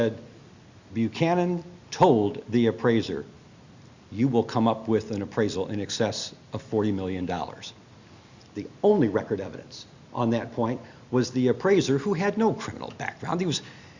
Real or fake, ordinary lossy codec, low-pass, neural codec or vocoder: real; Opus, 64 kbps; 7.2 kHz; none